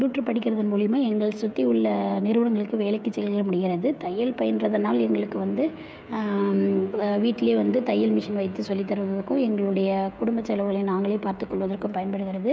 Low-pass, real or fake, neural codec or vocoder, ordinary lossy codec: none; fake; codec, 16 kHz, 16 kbps, FreqCodec, smaller model; none